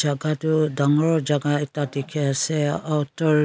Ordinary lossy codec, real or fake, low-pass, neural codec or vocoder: none; real; none; none